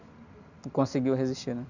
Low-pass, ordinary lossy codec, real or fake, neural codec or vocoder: 7.2 kHz; none; real; none